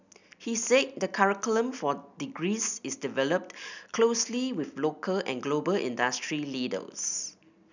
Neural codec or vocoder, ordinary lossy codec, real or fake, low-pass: none; none; real; 7.2 kHz